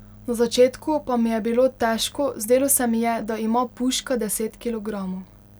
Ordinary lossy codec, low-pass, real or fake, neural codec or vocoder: none; none; real; none